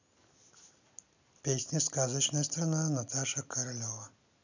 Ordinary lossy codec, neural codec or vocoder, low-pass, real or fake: none; none; 7.2 kHz; real